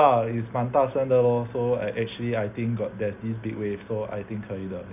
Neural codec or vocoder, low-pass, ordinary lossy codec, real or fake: none; 3.6 kHz; none; real